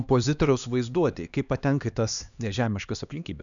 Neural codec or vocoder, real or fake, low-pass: codec, 16 kHz, 2 kbps, X-Codec, HuBERT features, trained on LibriSpeech; fake; 7.2 kHz